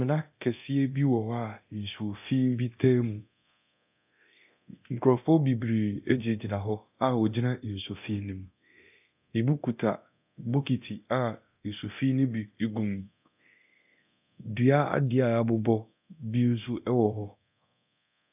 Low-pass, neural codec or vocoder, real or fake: 3.6 kHz; codec, 24 kHz, 0.9 kbps, DualCodec; fake